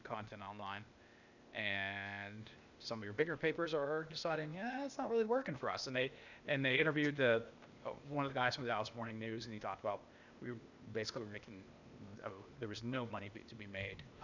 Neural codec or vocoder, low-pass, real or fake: codec, 16 kHz, 0.8 kbps, ZipCodec; 7.2 kHz; fake